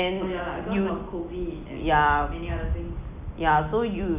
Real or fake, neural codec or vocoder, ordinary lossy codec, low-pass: real; none; none; 3.6 kHz